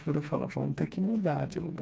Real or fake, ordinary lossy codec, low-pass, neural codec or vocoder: fake; none; none; codec, 16 kHz, 2 kbps, FreqCodec, smaller model